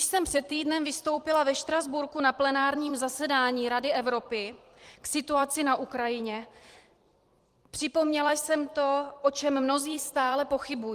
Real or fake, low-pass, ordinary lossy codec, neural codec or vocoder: fake; 14.4 kHz; Opus, 24 kbps; vocoder, 44.1 kHz, 128 mel bands every 256 samples, BigVGAN v2